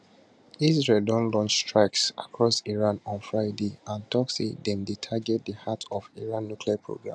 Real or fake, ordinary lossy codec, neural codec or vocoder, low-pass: real; none; none; none